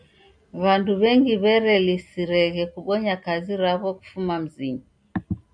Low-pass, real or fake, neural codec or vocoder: 9.9 kHz; real; none